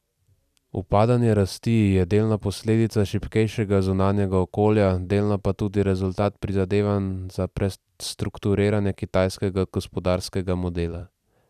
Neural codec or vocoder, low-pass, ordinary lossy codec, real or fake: vocoder, 44.1 kHz, 128 mel bands every 256 samples, BigVGAN v2; 14.4 kHz; none; fake